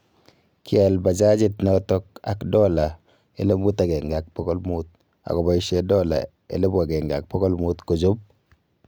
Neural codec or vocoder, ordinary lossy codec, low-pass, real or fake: none; none; none; real